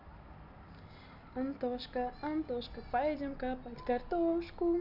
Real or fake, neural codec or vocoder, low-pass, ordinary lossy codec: real; none; 5.4 kHz; none